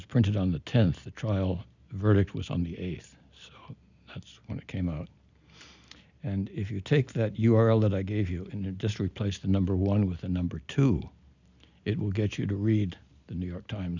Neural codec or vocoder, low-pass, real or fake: none; 7.2 kHz; real